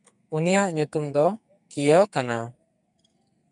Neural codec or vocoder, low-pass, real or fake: codec, 44.1 kHz, 2.6 kbps, SNAC; 10.8 kHz; fake